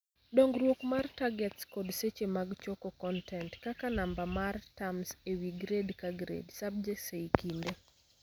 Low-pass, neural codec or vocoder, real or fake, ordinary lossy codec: none; none; real; none